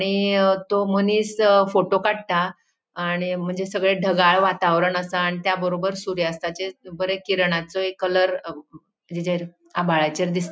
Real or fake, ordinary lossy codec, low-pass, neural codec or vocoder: real; none; none; none